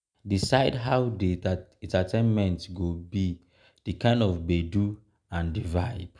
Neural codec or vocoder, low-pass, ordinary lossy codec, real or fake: none; 9.9 kHz; MP3, 96 kbps; real